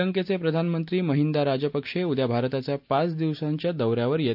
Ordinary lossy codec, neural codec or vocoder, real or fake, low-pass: none; none; real; 5.4 kHz